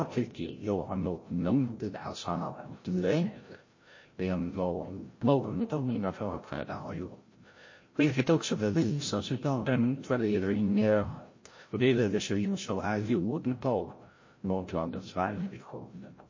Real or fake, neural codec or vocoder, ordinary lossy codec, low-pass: fake; codec, 16 kHz, 0.5 kbps, FreqCodec, larger model; MP3, 32 kbps; 7.2 kHz